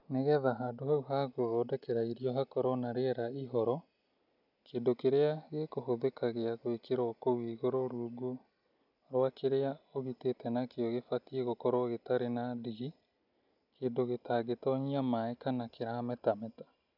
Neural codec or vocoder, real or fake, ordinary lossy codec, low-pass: none; real; none; 5.4 kHz